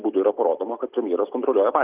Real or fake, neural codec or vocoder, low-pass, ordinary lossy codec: real; none; 3.6 kHz; Opus, 32 kbps